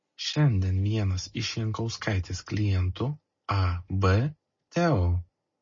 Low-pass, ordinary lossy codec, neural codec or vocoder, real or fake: 7.2 kHz; MP3, 32 kbps; none; real